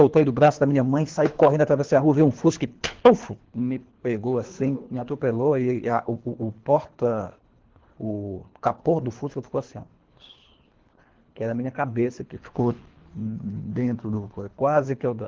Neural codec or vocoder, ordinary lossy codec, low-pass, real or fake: codec, 24 kHz, 3 kbps, HILCodec; Opus, 16 kbps; 7.2 kHz; fake